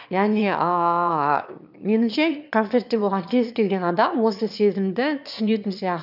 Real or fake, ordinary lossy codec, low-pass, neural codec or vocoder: fake; none; 5.4 kHz; autoencoder, 22.05 kHz, a latent of 192 numbers a frame, VITS, trained on one speaker